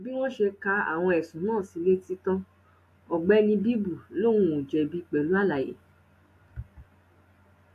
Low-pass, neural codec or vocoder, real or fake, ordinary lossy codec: 14.4 kHz; none; real; AAC, 64 kbps